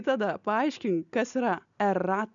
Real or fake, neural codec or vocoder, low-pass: real; none; 7.2 kHz